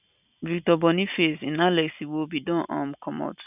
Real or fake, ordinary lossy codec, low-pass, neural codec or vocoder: real; none; 3.6 kHz; none